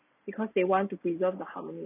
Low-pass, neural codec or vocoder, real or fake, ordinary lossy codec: 3.6 kHz; none; real; none